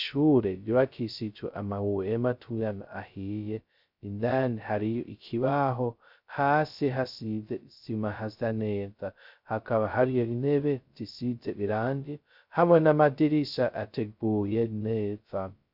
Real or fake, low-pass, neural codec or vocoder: fake; 5.4 kHz; codec, 16 kHz, 0.2 kbps, FocalCodec